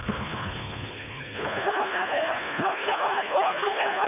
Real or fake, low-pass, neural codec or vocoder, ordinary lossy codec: fake; 3.6 kHz; codec, 24 kHz, 1.5 kbps, HILCodec; none